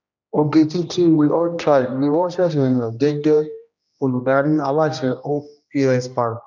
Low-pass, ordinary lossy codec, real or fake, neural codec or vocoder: 7.2 kHz; none; fake; codec, 16 kHz, 1 kbps, X-Codec, HuBERT features, trained on general audio